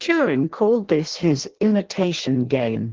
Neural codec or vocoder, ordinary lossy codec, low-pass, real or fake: codec, 16 kHz in and 24 kHz out, 0.6 kbps, FireRedTTS-2 codec; Opus, 16 kbps; 7.2 kHz; fake